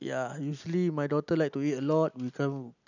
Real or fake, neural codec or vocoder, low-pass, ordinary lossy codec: real; none; 7.2 kHz; none